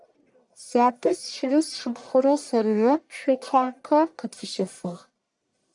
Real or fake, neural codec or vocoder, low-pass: fake; codec, 44.1 kHz, 1.7 kbps, Pupu-Codec; 10.8 kHz